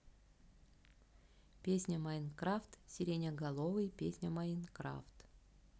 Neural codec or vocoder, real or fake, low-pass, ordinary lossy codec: none; real; none; none